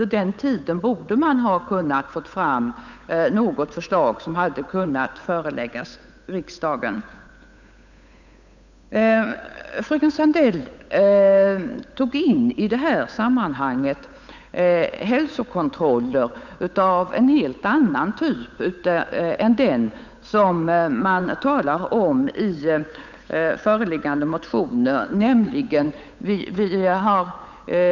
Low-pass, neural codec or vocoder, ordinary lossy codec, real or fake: 7.2 kHz; codec, 16 kHz, 8 kbps, FunCodec, trained on Chinese and English, 25 frames a second; none; fake